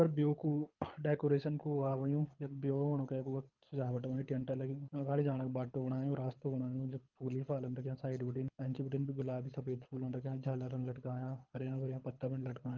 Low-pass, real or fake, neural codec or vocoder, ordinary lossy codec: 7.2 kHz; fake; codec, 24 kHz, 6 kbps, HILCodec; Opus, 24 kbps